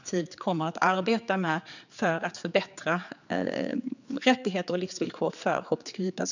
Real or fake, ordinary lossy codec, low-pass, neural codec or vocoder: fake; none; 7.2 kHz; codec, 16 kHz, 4 kbps, X-Codec, HuBERT features, trained on general audio